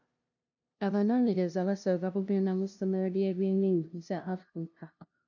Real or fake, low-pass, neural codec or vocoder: fake; 7.2 kHz; codec, 16 kHz, 0.5 kbps, FunCodec, trained on LibriTTS, 25 frames a second